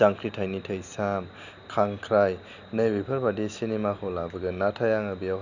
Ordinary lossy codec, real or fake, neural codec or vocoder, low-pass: none; real; none; 7.2 kHz